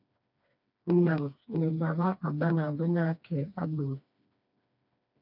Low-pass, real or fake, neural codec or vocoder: 5.4 kHz; fake; codec, 16 kHz, 2 kbps, FreqCodec, smaller model